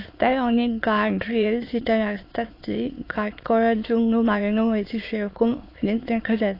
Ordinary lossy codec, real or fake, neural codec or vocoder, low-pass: AAC, 32 kbps; fake; autoencoder, 22.05 kHz, a latent of 192 numbers a frame, VITS, trained on many speakers; 5.4 kHz